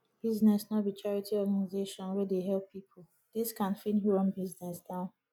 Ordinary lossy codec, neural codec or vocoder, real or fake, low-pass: none; none; real; 19.8 kHz